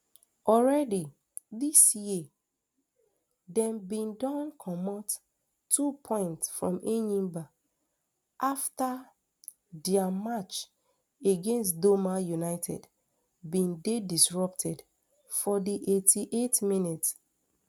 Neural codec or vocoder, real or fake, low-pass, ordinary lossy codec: none; real; none; none